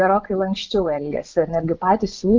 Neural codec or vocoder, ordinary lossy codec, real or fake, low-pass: vocoder, 24 kHz, 100 mel bands, Vocos; Opus, 32 kbps; fake; 7.2 kHz